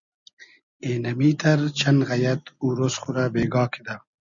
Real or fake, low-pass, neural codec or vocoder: real; 7.2 kHz; none